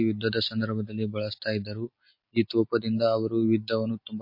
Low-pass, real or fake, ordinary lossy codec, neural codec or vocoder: 5.4 kHz; real; MP3, 32 kbps; none